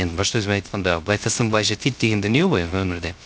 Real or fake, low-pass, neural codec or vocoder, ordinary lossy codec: fake; none; codec, 16 kHz, 0.3 kbps, FocalCodec; none